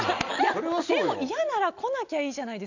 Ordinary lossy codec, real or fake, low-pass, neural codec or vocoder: none; real; 7.2 kHz; none